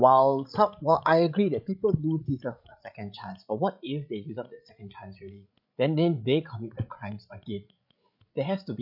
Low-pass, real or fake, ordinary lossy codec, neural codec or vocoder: 5.4 kHz; fake; none; codec, 16 kHz, 16 kbps, FreqCodec, larger model